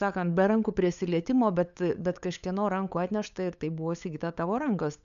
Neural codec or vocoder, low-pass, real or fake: codec, 16 kHz, 8 kbps, FunCodec, trained on LibriTTS, 25 frames a second; 7.2 kHz; fake